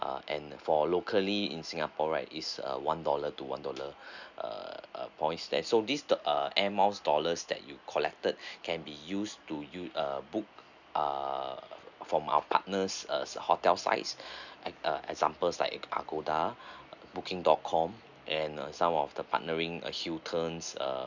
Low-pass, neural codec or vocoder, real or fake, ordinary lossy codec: 7.2 kHz; none; real; none